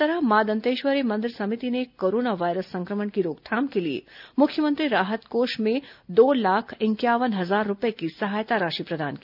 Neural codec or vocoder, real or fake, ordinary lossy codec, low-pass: none; real; none; 5.4 kHz